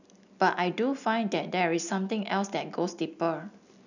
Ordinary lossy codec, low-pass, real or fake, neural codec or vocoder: none; 7.2 kHz; real; none